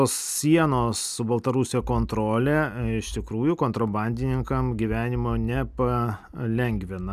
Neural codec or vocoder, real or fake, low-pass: none; real; 14.4 kHz